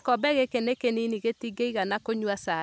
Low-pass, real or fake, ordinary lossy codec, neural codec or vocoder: none; real; none; none